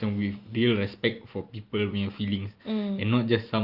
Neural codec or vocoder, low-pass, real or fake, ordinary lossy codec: none; 5.4 kHz; real; Opus, 24 kbps